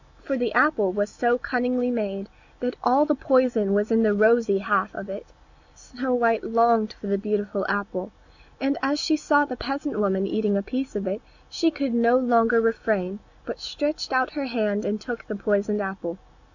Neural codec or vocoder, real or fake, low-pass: none; real; 7.2 kHz